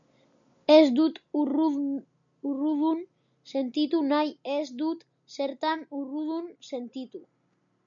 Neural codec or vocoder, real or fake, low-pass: none; real; 7.2 kHz